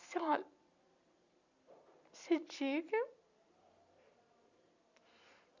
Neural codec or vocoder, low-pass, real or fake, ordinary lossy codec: none; 7.2 kHz; real; none